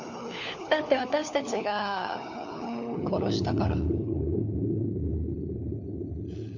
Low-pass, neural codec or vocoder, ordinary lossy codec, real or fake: 7.2 kHz; codec, 16 kHz, 4 kbps, FunCodec, trained on LibriTTS, 50 frames a second; none; fake